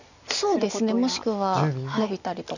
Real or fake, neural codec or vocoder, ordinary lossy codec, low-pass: real; none; none; 7.2 kHz